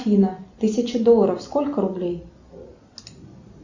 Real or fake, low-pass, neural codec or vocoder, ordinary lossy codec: real; 7.2 kHz; none; Opus, 64 kbps